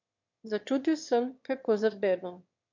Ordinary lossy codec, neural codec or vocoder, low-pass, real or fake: MP3, 48 kbps; autoencoder, 22.05 kHz, a latent of 192 numbers a frame, VITS, trained on one speaker; 7.2 kHz; fake